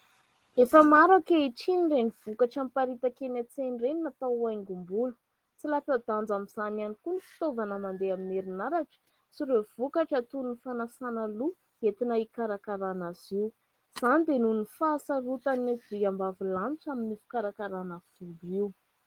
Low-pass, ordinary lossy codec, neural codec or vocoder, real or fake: 19.8 kHz; Opus, 16 kbps; none; real